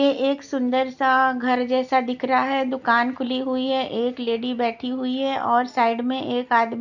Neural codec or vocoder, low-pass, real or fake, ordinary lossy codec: none; 7.2 kHz; real; none